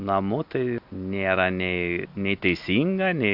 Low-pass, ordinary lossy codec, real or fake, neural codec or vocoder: 5.4 kHz; MP3, 48 kbps; real; none